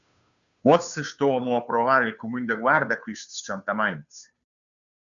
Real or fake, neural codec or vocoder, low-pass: fake; codec, 16 kHz, 2 kbps, FunCodec, trained on Chinese and English, 25 frames a second; 7.2 kHz